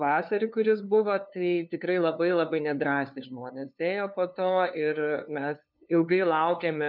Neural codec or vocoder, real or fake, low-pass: codec, 16 kHz, 2 kbps, FunCodec, trained on LibriTTS, 25 frames a second; fake; 5.4 kHz